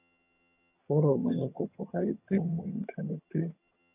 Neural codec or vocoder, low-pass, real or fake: vocoder, 22.05 kHz, 80 mel bands, HiFi-GAN; 3.6 kHz; fake